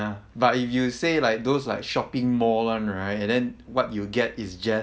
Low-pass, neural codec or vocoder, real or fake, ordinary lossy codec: none; none; real; none